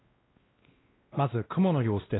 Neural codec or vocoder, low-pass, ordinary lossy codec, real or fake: codec, 16 kHz, 1 kbps, X-Codec, WavLM features, trained on Multilingual LibriSpeech; 7.2 kHz; AAC, 16 kbps; fake